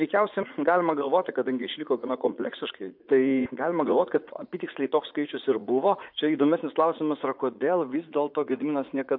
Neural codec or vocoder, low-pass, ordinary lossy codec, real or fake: vocoder, 44.1 kHz, 80 mel bands, Vocos; 5.4 kHz; MP3, 48 kbps; fake